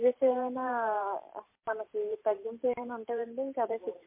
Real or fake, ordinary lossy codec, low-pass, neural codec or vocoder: fake; MP3, 24 kbps; 3.6 kHz; vocoder, 44.1 kHz, 128 mel bands every 512 samples, BigVGAN v2